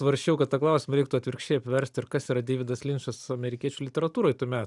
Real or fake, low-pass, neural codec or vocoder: real; 10.8 kHz; none